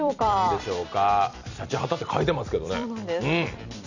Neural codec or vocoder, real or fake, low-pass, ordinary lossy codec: vocoder, 44.1 kHz, 128 mel bands every 256 samples, BigVGAN v2; fake; 7.2 kHz; none